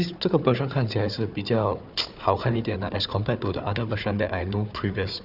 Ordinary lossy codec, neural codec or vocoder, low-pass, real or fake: none; codec, 16 kHz, 4 kbps, FunCodec, trained on Chinese and English, 50 frames a second; 5.4 kHz; fake